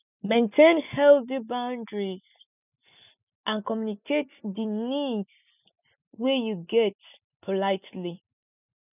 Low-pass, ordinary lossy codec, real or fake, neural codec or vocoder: 3.6 kHz; none; real; none